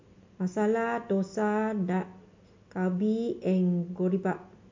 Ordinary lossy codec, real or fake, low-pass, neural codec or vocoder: MP3, 48 kbps; real; 7.2 kHz; none